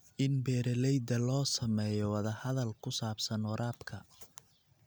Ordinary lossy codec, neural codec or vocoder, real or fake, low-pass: none; none; real; none